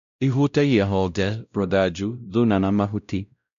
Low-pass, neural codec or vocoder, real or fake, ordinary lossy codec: 7.2 kHz; codec, 16 kHz, 0.5 kbps, X-Codec, WavLM features, trained on Multilingual LibriSpeech; fake; none